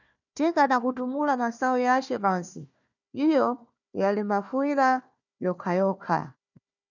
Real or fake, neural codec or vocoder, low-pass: fake; codec, 16 kHz, 1 kbps, FunCodec, trained on Chinese and English, 50 frames a second; 7.2 kHz